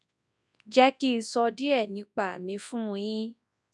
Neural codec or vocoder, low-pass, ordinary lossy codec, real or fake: codec, 24 kHz, 0.9 kbps, WavTokenizer, large speech release; 10.8 kHz; none; fake